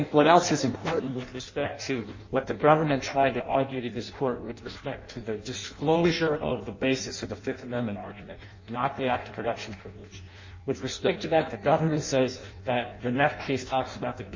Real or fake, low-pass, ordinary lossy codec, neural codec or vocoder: fake; 7.2 kHz; MP3, 32 kbps; codec, 16 kHz in and 24 kHz out, 0.6 kbps, FireRedTTS-2 codec